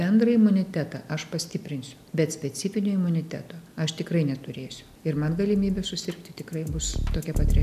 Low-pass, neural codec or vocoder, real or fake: 14.4 kHz; none; real